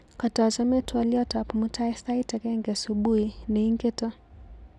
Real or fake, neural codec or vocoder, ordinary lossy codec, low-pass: real; none; none; none